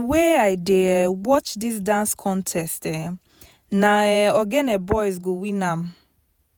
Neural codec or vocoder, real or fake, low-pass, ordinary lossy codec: vocoder, 48 kHz, 128 mel bands, Vocos; fake; none; none